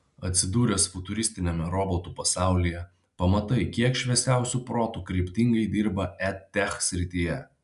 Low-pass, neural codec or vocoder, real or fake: 10.8 kHz; none; real